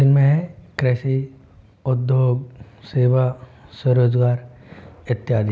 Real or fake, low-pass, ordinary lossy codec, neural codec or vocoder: real; none; none; none